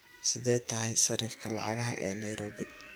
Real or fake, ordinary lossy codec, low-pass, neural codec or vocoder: fake; none; none; codec, 44.1 kHz, 2.6 kbps, SNAC